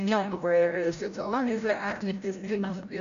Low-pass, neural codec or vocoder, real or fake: 7.2 kHz; codec, 16 kHz, 0.5 kbps, FreqCodec, larger model; fake